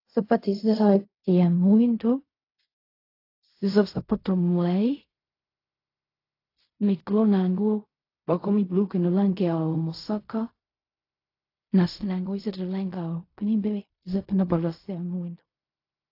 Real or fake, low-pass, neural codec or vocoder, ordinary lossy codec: fake; 5.4 kHz; codec, 16 kHz in and 24 kHz out, 0.4 kbps, LongCat-Audio-Codec, fine tuned four codebook decoder; AAC, 32 kbps